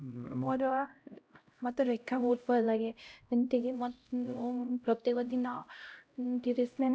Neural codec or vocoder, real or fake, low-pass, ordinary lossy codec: codec, 16 kHz, 0.5 kbps, X-Codec, HuBERT features, trained on LibriSpeech; fake; none; none